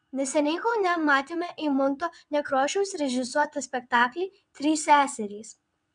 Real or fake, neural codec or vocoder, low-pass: fake; vocoder, 22.05 kHz, 80 mel bands, WaveNeXt; 9.9 kHz